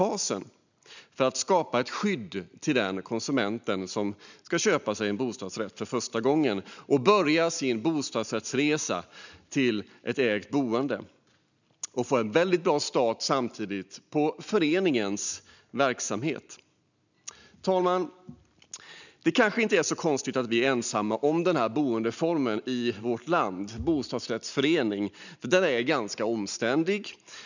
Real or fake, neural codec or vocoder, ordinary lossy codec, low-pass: real; none; none; 7.2 kHz